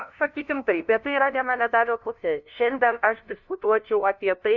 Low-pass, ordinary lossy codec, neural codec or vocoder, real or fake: 7.2 kHz; MP3, 64 kbps; codec, 16 kHz, 0.5 kbps, FunCodec, trained on LibriTTS, 25 frames a second; fake